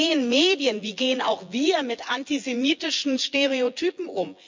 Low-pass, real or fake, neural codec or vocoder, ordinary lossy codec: 7.2 kHz; fake; vocoder, 24 kHz, 100 mel bands, Vocos; MP3, 64 kbps